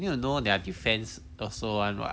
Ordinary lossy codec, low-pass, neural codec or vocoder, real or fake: none; none; none; real